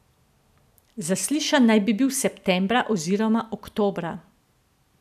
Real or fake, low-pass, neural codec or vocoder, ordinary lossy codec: real; 14.4 kHz; none; none